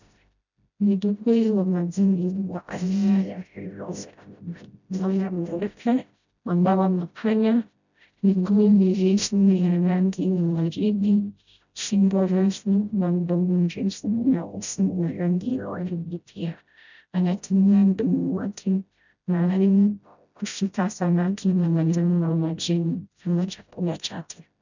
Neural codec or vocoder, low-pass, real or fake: codec, 16 kHz, 0.5 kbps, FreqCodec, smaller model; 7.2 kHz; fake